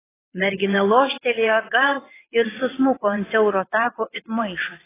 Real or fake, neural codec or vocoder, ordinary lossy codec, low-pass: fake; vocoder, 22.05 kHz, 80 mel bands, WaveNeXt; AAC, 16 kbps; 3.6 kHz